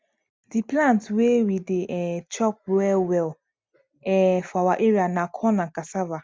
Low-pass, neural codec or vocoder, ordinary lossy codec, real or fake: none; none; none; real